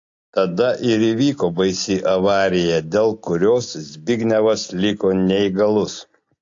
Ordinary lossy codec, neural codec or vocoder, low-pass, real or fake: AAC, 48 kbps; none; 7.2 kHz; real